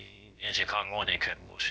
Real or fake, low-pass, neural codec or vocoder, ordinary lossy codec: fake; none; codec, 16 kHz, about 1 kbps, DyCAST, with the encoder's durations; none